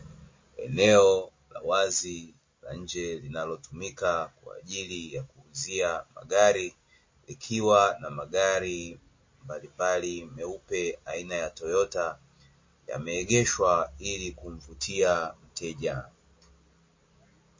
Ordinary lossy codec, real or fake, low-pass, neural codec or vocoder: MP3, 32 kbps; real; 7.2 kHz; none